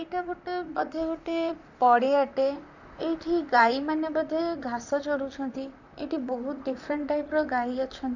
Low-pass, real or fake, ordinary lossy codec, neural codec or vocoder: 7.2 kHz; fake; none; vocoder, 44.1 kHz, 128 mel bands, Pupu-Vocoder